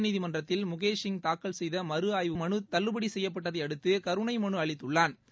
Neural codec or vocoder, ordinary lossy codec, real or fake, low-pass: none; none; real; none